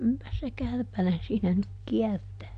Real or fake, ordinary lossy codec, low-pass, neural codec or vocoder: real; MP3, 96 kbps; 9.9 kHz; none